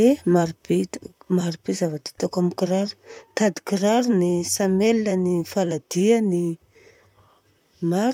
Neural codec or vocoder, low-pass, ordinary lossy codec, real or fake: vocoder, 44.1 kHz, 128 mel bands, Pupu-Vocoder; 19.8 kHz; none; fake